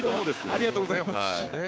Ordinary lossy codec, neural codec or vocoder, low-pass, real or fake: none; codec, 16 kHz, 6 kbps, DAC; none; fake